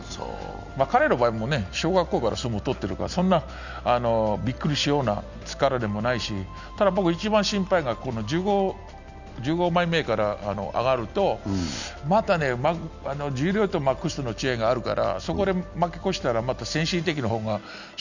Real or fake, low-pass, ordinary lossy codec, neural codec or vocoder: real; 7.2 kHz; none; none